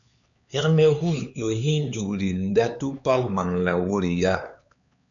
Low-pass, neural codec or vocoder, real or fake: 7.2 kHz; codec, 16 kHz, 4 kbps, X-Codec, HuBERT features, trained on LibriSpeech; fake